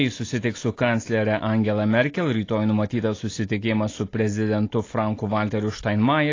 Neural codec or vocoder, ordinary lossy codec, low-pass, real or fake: none; AAC, 32 kbps; 7.2 kHz; real